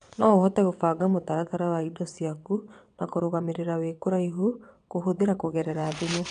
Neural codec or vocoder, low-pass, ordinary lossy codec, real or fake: vocoder, 22.05 kHz, 80 mel bands, WaveNeXt; 9.9 kHz; none; fake